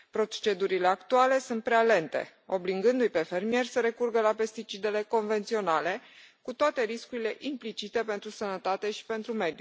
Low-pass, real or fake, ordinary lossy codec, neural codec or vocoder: none; real; none; none